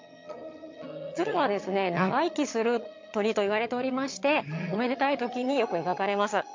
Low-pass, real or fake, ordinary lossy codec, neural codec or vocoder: 7.2 kHz; fake; MP3, 48 kbps; vocoder, 22.05 kHz, 80 mel bands, HiFi-GAN